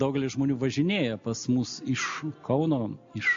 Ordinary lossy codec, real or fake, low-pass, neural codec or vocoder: MP3, 48 kbps; real; 7.2 kHz; none